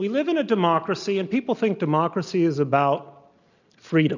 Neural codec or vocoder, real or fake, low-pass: none; real; 7.2 kHz